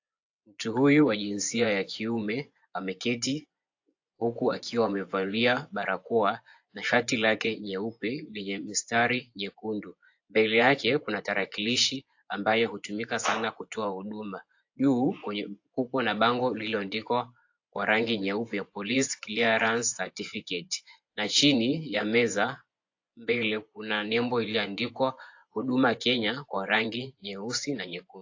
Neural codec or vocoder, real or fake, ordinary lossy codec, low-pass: vocoder, 24 kHz, 100 mel bands, Vocos; fake; AAC, 48 kbps; 7.2 kHz